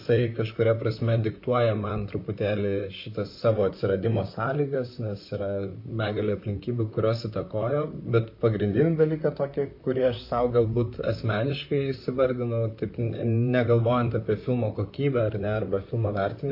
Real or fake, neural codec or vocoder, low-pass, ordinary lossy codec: fake; vocoder, 44.1 kHz, 128 mel bands, Pupu-Vocoder; 5.4 kHz; MP3, 48 kbps